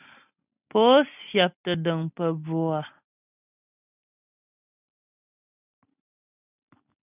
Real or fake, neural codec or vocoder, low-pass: real; none; 3.6 kHz